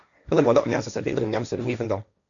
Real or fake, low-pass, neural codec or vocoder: fake; 7.2 kHz; codec, 16 kHz, 1.1 kbps, Voila-Tokenizer